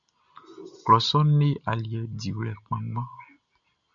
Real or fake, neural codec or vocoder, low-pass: real; none; 7.2 kHz